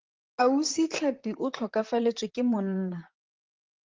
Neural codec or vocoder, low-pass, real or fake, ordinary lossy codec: vocoder, 44.1 kHz, 128 mel bands, Pupu-Vocoder; 7.2 kHz; fake; Opus, 16 kbps